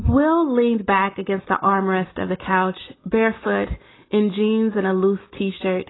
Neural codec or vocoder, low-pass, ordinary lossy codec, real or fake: none; 7.2 kHz; AAC, 16 kbps; real